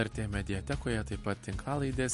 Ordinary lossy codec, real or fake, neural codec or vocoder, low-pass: MP3, 48 kbps; real; none; 14.4 kHz